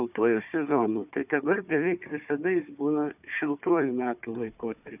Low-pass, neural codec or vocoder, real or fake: 3.6 kHz; codec, 16 kHz, 4 kbps, FunCodec, trained on Chinese and English, 50 frames a second; fake